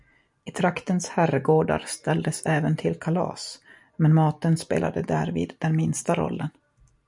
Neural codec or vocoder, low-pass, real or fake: none; 10.8 kHz; real